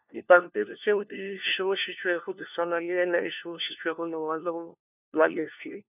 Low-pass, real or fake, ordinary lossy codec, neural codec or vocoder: 3.6 kHz; fake; none; codec, 16 kHz, 1 kbps, FunCodec, trained on LibriTTS, 50 frames a second